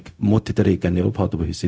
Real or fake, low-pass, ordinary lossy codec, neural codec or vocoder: fake; none; none; codec, 16 kHz, 0.4 kbps, LongCat-Audio-Codec